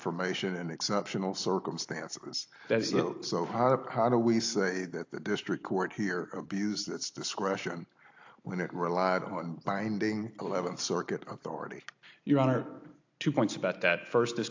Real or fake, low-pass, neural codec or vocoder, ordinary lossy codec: real; 7.2 kHz; none; AAC, 48 kbps